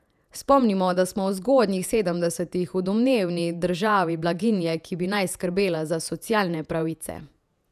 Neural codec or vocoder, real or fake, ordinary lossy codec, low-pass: vocoder, 48 kHz, 128 mel bands, Vocos; fake; none; 14.4 kHz